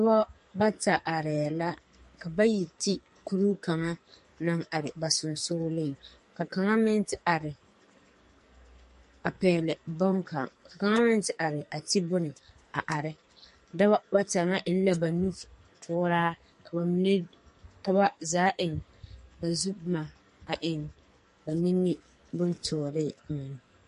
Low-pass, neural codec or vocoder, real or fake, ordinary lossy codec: 14.4 kHz; codec, 44.1 kHz, 2.6 kbps, SNAC; fake; MP3, 48 kbps